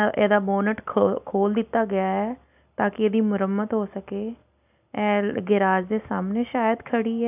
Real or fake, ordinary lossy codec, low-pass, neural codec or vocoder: real; none; 3.6 kHz; none